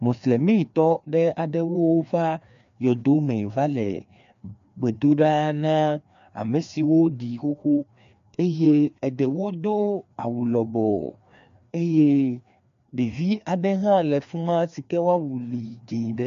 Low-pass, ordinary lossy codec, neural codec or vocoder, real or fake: 7.2 kHz; MP3, 64 kbps; codec, 16 kHz, 2 kbps, FreqCodec, larger model; fake